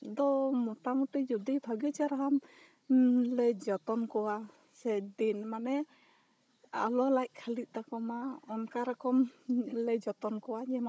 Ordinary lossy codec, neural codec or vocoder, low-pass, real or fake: none; codec, 16 kHz, 16 kbps, FunCodec, trained on Chinese and English, 50 frames a second; none; fake